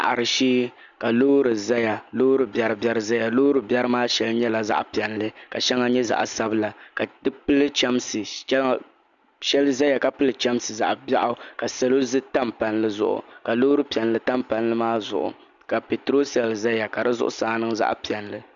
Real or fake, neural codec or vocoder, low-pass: real; none; 7.2 kHz